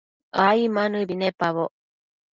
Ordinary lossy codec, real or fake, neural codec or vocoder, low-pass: Opus, 32 kbps; real; none; 7.2 kHz